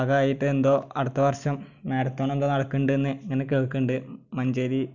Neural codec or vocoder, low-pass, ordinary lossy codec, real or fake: none; 7.2 kHz; none; real